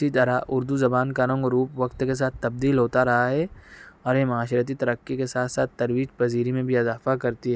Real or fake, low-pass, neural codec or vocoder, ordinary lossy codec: real; none; none; none